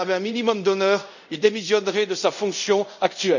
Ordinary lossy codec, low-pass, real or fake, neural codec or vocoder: none; 7.2 kHz; fake; codec, 24 kHz, 0.5 kbps, DualCodec